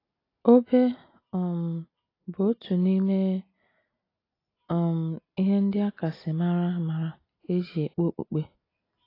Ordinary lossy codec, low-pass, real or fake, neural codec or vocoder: AAC, 24 kbps; 5.4 kHz; real; none